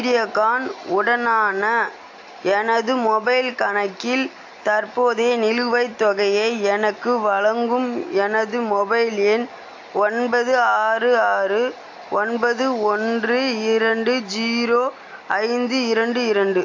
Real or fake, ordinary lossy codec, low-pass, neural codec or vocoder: real; AAC, 48 kbps; 7.2 kHz; none